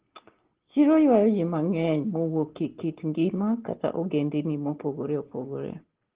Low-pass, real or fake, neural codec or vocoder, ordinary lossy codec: 3.6 kHz; real; none; Opus, 16 kbps